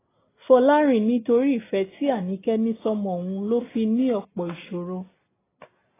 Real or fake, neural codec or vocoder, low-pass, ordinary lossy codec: real; none; 3.6 kHz; AAC, 16 kbps